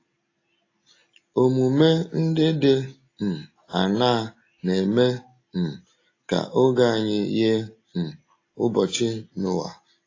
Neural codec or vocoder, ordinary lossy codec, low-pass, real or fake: none; AAC, 32 kbps; 7.2 kHz; real